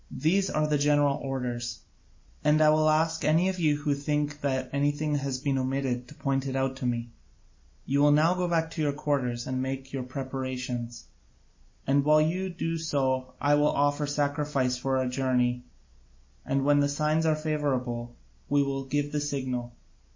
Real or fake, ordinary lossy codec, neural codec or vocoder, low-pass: real; MP3, 32 kbps; none; 7.2 kHz